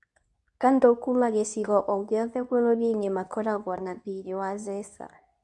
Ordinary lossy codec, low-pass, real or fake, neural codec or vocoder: none; 10.8 kHz; fake; codec, 24 kHz, 0.9 kbps, WavTokenizer, medium speech release version 2